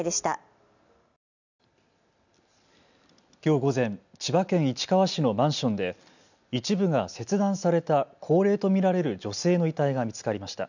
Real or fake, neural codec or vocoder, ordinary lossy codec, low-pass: real; none; none; 7.2 kHz